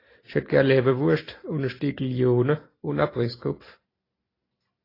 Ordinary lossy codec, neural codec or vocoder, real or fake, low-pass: AAC, 24 kbps; none; real; 5.4 kHz